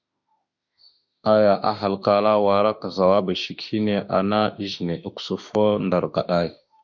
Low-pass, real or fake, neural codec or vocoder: 7.2 kHz; fake; autoencoder, 48 kHz, 32 numbers a frame, DAC-VAE, trained on Japanese speech